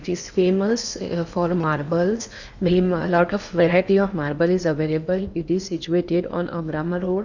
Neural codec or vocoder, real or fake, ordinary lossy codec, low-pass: codec, 16 kHz in and 24 kHz out, 0.8 kbps, FocalCodec, streaming, 65536 codes; fake; none; 7.2 kHz